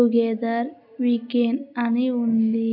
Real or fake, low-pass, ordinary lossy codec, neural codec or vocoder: real; 5.4 kHz; none; none